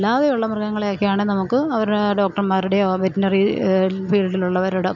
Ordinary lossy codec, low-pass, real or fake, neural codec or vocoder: none; 7.2 kHz; real; none